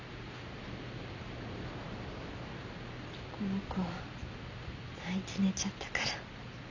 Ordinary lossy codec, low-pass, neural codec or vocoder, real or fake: none; 7.2 kHz; none; real